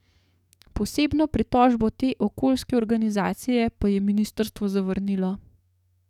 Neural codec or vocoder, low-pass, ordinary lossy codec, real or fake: codec, 44.1 kHz, 7.8 kbps, DAC; 19.8 kHz; none; fake